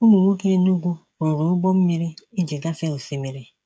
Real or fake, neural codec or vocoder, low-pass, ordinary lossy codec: fake; codec, 16 kHz, 16 kbps, FreqCodec, smaller model; none; none